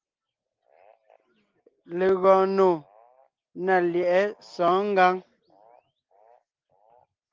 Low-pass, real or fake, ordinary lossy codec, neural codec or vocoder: 7.2 kHz; real; Opus, 32 kbps; none